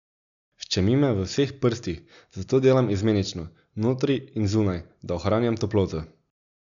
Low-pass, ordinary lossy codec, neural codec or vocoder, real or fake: 7.2 kHz; none; none; real